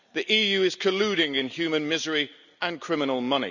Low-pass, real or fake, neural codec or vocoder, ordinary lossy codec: 7.2 kHz; real; none; none